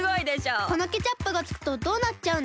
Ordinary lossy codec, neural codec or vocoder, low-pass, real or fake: none; none; none; real